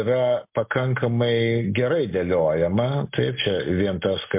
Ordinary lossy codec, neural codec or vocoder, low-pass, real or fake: MP3, 32 kbps; none; 3.6 kHz; real